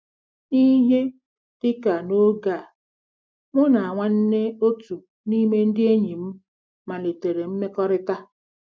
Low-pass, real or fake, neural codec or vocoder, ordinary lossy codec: none; real; none; none